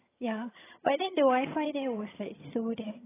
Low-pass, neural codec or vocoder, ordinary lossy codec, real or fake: 3.6 kHz; vocoder, 22.05 kHz, 80 mel bands, HiFi-GAN; AAC, 16 kbps; fake